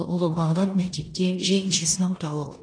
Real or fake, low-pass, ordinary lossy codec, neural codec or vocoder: fake; 9.9 kHz; AAC, 32 kbps; codec, 16 kHz in and 24 kHz out, 0.9 kbps, LongCat-Audio-Codec, four codebook decoder